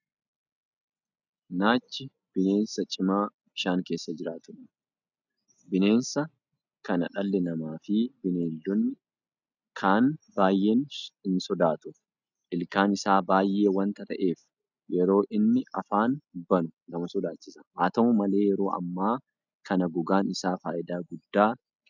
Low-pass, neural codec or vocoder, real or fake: 7.2 kHz; none; real